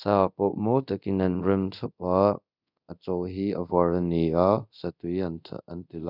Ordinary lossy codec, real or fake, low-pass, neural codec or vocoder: none; fake; 5.4 kHz; codec, 24 kHz, 0.5 kbps, DualCodec